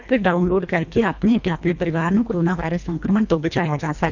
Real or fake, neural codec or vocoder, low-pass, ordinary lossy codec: fake; codec, 24 kHz, 1.5 kbps, HILCodec; 7.2 kHz; none